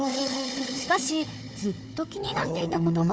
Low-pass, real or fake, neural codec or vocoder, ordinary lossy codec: none; fake; codec, 16 kHz, 4 kbps, FunCodec, trained on Chinese and English, 50 frames a second; none